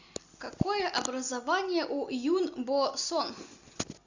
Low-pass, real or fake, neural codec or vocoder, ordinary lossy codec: 7.2 kHz; real; none; Opus, 64 kbps